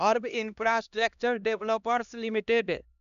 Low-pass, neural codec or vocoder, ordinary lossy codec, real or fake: 7.2 kHz; codec, 16 kHz, 1 kbps, X-Codec, HuBERT features, trained on LibriSpeech; none; fake